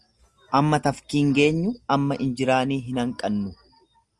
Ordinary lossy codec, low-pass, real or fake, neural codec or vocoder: Opus, 32 kbps; 10.8 kHz; real; none